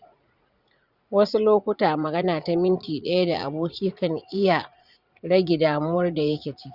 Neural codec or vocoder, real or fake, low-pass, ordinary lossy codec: vocoder, 44.1 kHz, 80 mel bands, Vocos; fake; 5.4 kHz; Opus, 64 kbps